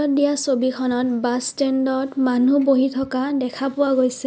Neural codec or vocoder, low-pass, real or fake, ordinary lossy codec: none; none; real; none